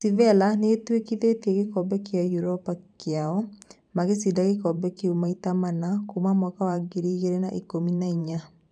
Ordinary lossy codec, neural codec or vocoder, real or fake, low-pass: none; vocoder, 44.1 kHz, 128 mel bands every 512 samples, BigVGAN v2; fake; 9.9 kHz